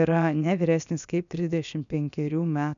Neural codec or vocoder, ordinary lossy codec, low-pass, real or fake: codec, 16 kHz, about 1 kbps, DyCAST, with the encoder's durations; MP3, 96 kbps; 7.2 kHz; fake